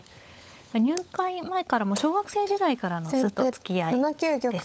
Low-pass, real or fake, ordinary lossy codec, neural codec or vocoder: none; fake; none; codec, 16 kHz, 16 kbps, FunCodec, trained on LibriTTS, 50 frames a second